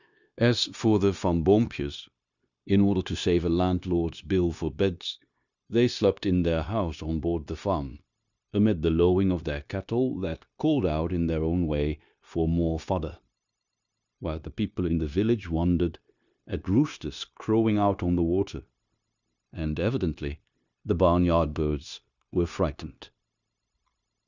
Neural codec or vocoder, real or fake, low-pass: codec, 16 kHz, 0.9 kbps, LongCat-Audio-Codec; fake; 7.2 kHz